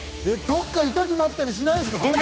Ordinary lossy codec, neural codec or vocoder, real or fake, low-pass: none; codec, 16 kHz, 2 kbps, X-Codec, HuBERT features, trained on general audio; fake; none